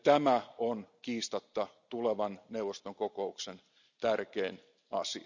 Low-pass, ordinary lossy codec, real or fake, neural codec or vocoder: 7.2 kHz; none; real; none